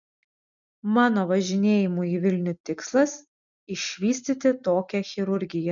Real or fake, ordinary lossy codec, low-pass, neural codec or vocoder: real; MP3, 96 kbps; 7.2 kHz; none